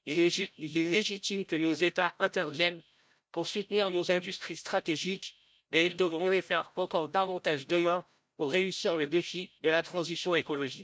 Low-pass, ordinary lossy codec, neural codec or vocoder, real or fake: none; none; codec, 16 kHz, 0.5 kbps, FreqCodec, larger model; fake